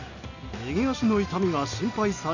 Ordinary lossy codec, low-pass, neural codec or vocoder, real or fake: none; 7.2 kHz; none; real